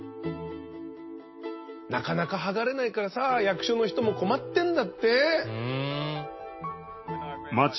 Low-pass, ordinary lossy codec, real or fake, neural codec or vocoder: 7.2 kHz; MP3, 24 kbps; real; none